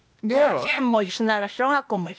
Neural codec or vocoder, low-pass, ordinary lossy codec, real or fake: codec, 16 kHz, 0.8 kbps, ZipCodec; none; none; fake